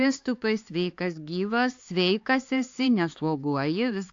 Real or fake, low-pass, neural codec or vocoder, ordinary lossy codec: fake; 7.2 kHz; codec, 16 kHz, 4 kbps, X-Codec, HuBERT features, trained on LibriSpeech; AAC, 48 kbps